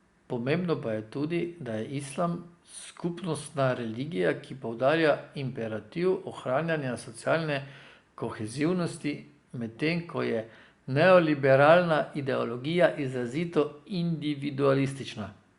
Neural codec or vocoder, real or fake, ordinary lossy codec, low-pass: none; real; Opus, 64 kbps; 10.8 kHz